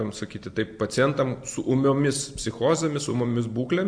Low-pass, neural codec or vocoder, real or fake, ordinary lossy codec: 9.9 kHz; none; real; AAC, 64 kbps